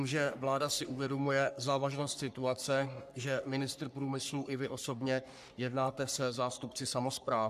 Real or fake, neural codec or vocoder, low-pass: fake; codec, 44.1 kHz, 3.4 kbps, Pupu-Codec; 14.4 kHz